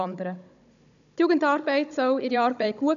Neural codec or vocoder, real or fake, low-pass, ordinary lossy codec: codec, 16 kHz, 16 kbps, FunCodec, trained on Chinese and English, 50 frames a second; fake; 7.2 kHz; none